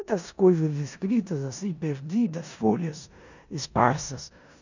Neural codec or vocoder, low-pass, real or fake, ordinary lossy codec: codec, 16 kHz in and 24 kHz out, 0.9 kbps, LongCat-Audio-Codec, four codebook decoder; 7.2 kHz; fake; none